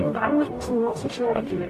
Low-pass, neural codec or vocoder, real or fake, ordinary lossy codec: 14.4 kHz; codec, 44.1 kHz, 0.9 kbps, DAC; fake; AAC, 64 kbps